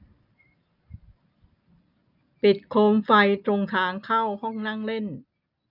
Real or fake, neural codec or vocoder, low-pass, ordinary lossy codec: real; none; 5.4 kHz; none